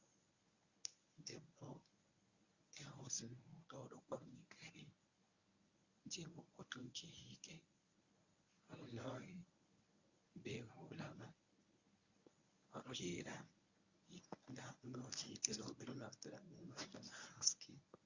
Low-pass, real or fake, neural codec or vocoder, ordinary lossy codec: 7.2 kHz; fake; codec, 24 kHz, 0.9 kbps, WavTokenizer, medium speech release version 1; Opus, 64 kbps